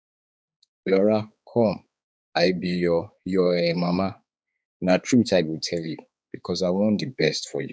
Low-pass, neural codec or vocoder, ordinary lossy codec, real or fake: none; codec, 16 kHz, 4 kbps, X-Codec, HuBERT features, trained on balanced general audio; none; fake